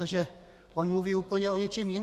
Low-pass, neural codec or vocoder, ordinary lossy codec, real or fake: 14.4 kHz; codec, 32 kHz, 1.9 kbps, SNAC; Opus, 64 kbps; fake